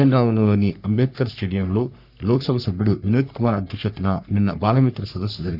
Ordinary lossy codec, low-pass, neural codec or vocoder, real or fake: none; 5.4 kHz; codec, 44.1 kHz, 3.4 kbps, Pupu-Codec; fake